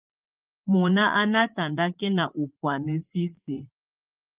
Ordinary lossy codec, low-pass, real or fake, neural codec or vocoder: Opus, 32 kbps; 3.6 kHz; fake; vocoder, 44.1 kHz, 80 mel bands, Vocos